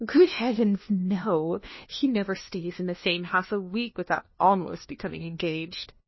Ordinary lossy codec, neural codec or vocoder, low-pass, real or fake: MP3, 24 kbps; codec, 16 kHz, 1 kbps, FunCodec, trained on LibriTTS, 50 frames a second; 7.2 kHz; fake